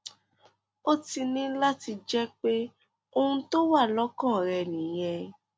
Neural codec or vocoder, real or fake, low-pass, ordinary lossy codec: none; real; none; none